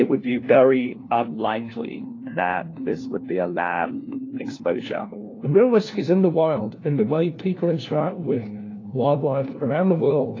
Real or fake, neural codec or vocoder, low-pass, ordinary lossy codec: fake; codec, 16 kHz, 1 kbps, FunCodec, trained on LibriTTS, 50 frames a second; 7.2 kHz; AAC, 32 kbps